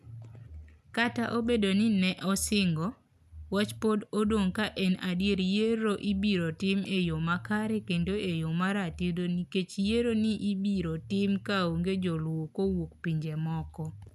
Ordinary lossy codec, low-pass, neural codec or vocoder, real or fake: none; 14.4 kHz; none; real